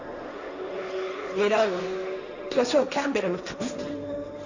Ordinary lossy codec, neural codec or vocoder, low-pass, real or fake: none; codec, 16 kHz, 1.1 kbps, Voila-Tokenizer; 7.2 kHz; fake